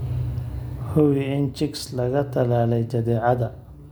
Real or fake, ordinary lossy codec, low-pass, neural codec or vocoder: real; none; none; none